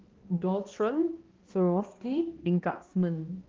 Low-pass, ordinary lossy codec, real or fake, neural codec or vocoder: 7.2 kHz; Opus, 16 kbps; fake; codec, 16 kHz, 1 kbps, X-Codec, HuBERT features, trained on balanced general audio